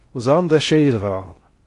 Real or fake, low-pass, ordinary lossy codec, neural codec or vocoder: fake; 10.8 kHz; AAC, 48 kbps; codec, 16 kHz in and 24 kHz out, 0.6 kbps, FocalCodec, streaming, 4096 codes